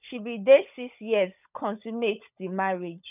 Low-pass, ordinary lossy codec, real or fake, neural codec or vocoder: 3.6 kHz; none; fake; codec, 16 kHz, 16 kbps, FunCodec, trained on LibriTTS, 50 frames a second